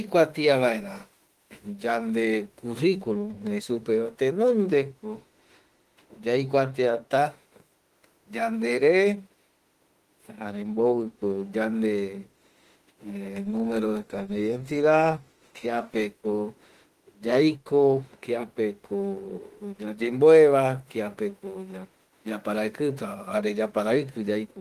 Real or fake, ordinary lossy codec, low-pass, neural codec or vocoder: fake; Opus, 24 kbps; 14.4 kHz; autoencoder, 48 kHz, 32 numbers a frame, DAC-VAE, trained on Japanese speech